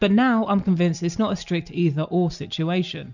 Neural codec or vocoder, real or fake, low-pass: none; real; 7.2 kHz